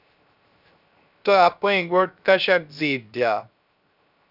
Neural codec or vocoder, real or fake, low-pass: codec, 16 kHz, 0.3 kbps, FocalCodec; fake; 5.4 kHz